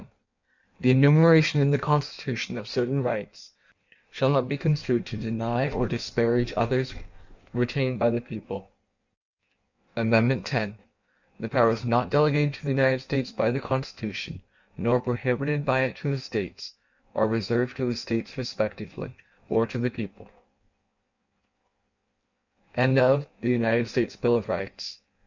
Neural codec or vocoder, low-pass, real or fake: codec, 16 kHz in and 24 kHz out, 1.1 kbps, FireRedTTS-2 codec; 7.2 kHz; fake